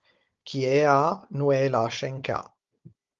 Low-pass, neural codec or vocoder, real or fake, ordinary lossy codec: 7.2 kHz; codec, 16 kHz, 16 kbps, FunCodec, trained on Chinese and English, 50 frames a second; fake; Opus, 32 kbps